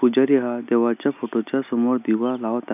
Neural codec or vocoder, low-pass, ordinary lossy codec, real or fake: none; 3.6 kHz; none; real